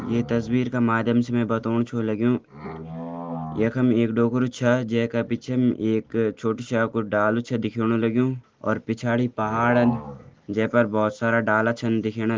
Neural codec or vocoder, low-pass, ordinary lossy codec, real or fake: none; 7.2 kHz; Opus, 16 kbps; real